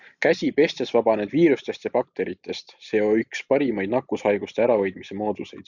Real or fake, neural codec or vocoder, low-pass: real; none; 7.2 kHz